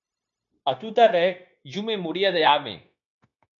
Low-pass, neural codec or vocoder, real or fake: 7.2 kHz; codec, 16 kHz, 0.9 kbps, LongCat-Audio-Codec; fake